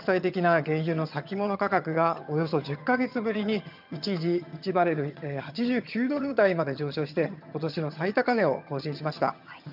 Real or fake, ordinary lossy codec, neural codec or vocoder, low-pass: fake; none; vocoder, 22.05 kHz, 80 mel bands, HiFi-GAN; 5.4 kHz